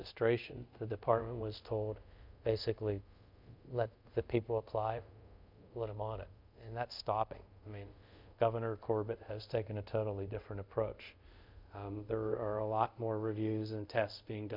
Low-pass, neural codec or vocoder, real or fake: 5.4 kHz; codec, 24 kHz, 0.5 kbps, DualCodec; fake